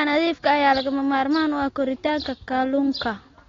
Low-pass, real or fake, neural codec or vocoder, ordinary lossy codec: 7.2 kHz; real; none; AAC, 32 kbps